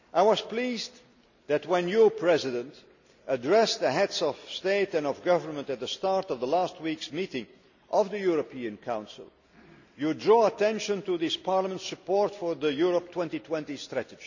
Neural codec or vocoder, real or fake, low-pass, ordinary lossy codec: none; real; 7.2 kHz; none